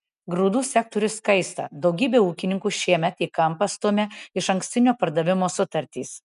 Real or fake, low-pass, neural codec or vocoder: real; 10.8 kHz; none